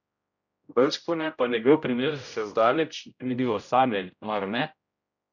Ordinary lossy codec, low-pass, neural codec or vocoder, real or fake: none; 7.2 kHz; codec, 16 kHz, 0.5 kbps, X-Codec, HuBERT features, trained on general audio; fake